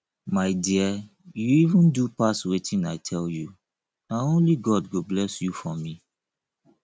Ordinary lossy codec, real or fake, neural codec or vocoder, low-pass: none; real; none; none